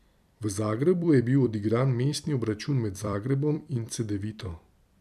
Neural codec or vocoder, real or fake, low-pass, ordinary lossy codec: vocoder, 44.1 kHz, 128 mel bands every 256 samples, BigVGAN v2; fake; 14.4 kHz; none